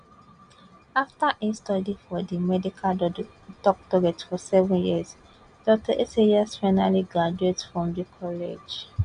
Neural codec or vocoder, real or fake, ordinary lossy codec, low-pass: none; real; none; 9.9 kHz